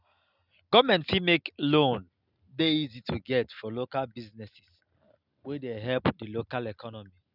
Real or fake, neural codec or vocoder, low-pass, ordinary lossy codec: real; none; 5.4 kHz; none